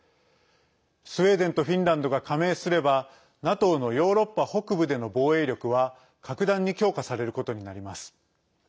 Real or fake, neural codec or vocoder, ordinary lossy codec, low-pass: real; none; none; none